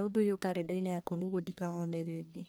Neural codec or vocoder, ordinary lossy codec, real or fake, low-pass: codec, 44.1 kHz, 1.7 kbps, Pupu-Codec; none; fake; none